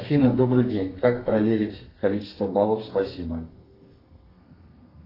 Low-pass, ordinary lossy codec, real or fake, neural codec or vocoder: 5.4 kHz; AAC, 24 kbps; fake; codec, 32 kHz, 1.9 kbps, SNAC